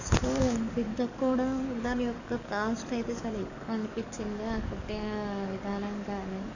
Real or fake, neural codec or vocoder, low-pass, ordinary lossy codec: fake; codec, 44.1 kHz, 7.8 kbps, DAC; 7.2 kHz; none